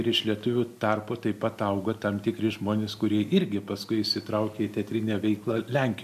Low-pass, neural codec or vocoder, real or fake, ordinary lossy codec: 14.4 kHz; none; real; MP3, 64 kbps